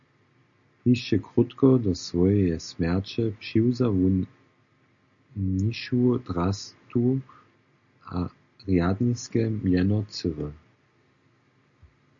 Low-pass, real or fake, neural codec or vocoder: 7.2 kHz; real; none